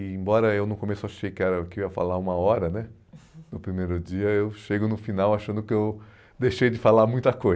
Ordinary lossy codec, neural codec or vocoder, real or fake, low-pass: none; none; real; none